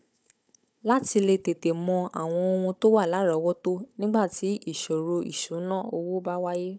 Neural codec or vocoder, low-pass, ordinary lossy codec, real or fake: codec, 16 kHz, 16 kbps, FunCodec, trained on Chinese and English, 50 frames a second; none; none; fake